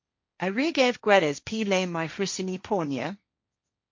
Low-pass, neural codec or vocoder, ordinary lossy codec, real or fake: 7.2 kHz; codec, 16 kHz, 1.1 kbps, Voila-Tokenizer; MP3, 48 kbps; fake